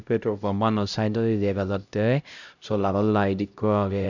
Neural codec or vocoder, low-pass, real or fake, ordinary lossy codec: codec, 16 kHz, 0.5 kbps, X-Codec, HuBERT features, trained on LibriSpeech; 7.2 kHz; fake; none